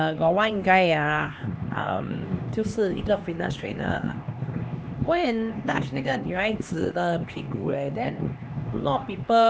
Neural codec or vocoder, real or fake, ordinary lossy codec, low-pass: codec, 16 kHz, 2 kbps, X-Codec, HuBERT features, trained on LibriSpeech; fake; none; none